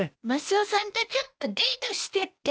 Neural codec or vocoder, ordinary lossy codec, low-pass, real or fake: codec, 16 kHz, 0.8 kbps, ZipCodec; none; none; fake